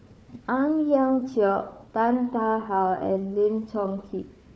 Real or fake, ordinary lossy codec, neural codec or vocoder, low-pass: fake; none; codec, 16 kHz, 4 kbps, FunCodec, trained on Chinese and English, 50 frames a second; none